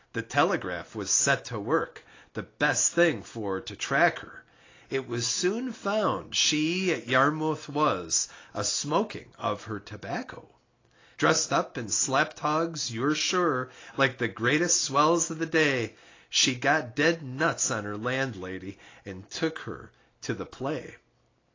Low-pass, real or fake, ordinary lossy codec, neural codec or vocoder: 7.2 kHz; real; AAC, 32 kbps; none